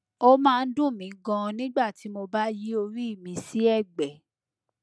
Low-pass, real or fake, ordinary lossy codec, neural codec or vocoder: none; real; none; none